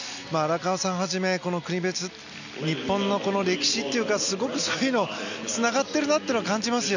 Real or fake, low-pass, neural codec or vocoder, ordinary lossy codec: real; 7.2 kHz; none; none